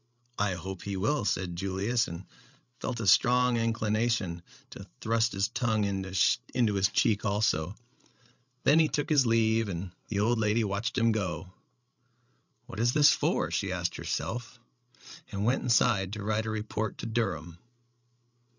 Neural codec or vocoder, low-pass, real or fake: codec, 16 kHz, 16 kbps, FreqCodec, larger model; 7.2 kHz; fake